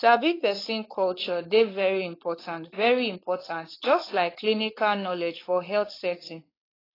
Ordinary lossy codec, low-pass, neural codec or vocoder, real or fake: AAC, 24 kbps; 5.4 kHz; codec, 16 kHz, 4.8 kbps, FACodec; fake